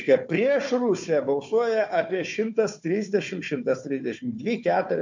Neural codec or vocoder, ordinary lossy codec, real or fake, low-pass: codec, 16 kHz, 4 kbps, FunCodec, trained on Chinese and English, 50 frames a second; MP3, 48 kbps; fake; 7.2 kHz